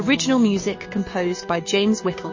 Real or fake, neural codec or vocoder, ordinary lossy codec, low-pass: fake; codec, 16 kHz, 6 kbps, DAC; MP3, 32 kbps; 7.2 kHz